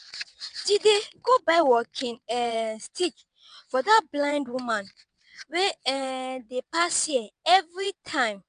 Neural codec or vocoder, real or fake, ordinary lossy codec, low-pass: vocoder, 22.05 kHz, 80 mel bands, WaveNeXt; fake; MP3, 96 kbps; 9.9 kHz